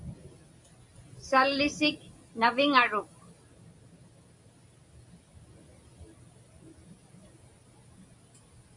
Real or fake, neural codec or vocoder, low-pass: real; none; 10.8 kHz